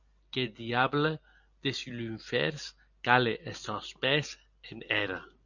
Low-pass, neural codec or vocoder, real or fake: 7.2 kHz; none; real